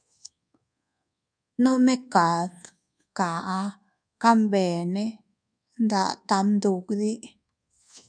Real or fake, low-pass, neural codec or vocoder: fake; 9.9 kHz; codec, 24 kHz, 1.2 kbps, DualCodec